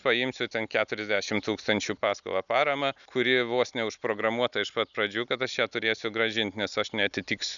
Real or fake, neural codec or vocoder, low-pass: real; none; 7.2 kHz